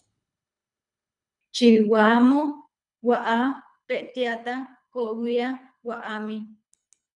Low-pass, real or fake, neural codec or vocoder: 10.8 kHz; fake; codec, 24 kHz, 3 kbps, HILCodec